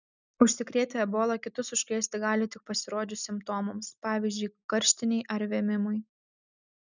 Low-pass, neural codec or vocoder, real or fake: 7.2 kHz; none; real